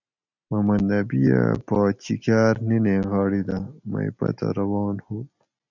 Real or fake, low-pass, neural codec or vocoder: real; 7.2 kHz; none